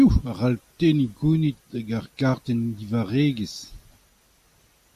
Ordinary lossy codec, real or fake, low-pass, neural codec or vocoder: MP3, 96 kbps; real; 14.4 kHz; none